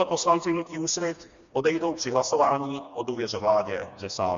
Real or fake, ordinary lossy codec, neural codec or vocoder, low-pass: fake; Opus, 64 kbps; codec, 16 kHz, 2 kbps, FreqCodec, smaller model; 7.2 kHz